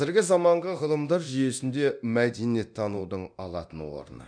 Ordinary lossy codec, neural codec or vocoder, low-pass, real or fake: none; codec, 24 kHz, 0.9 kbps, DualCodec; 9.9 kHz; fake